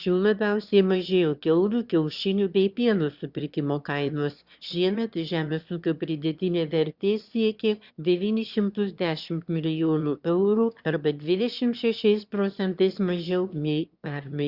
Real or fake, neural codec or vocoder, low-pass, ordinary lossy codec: fake; autoencoder, 22.05 kHz, a latent of 192 numbers a frame, VITS, trained on one speaker; 5.4 kHz; Opus, 64 kbps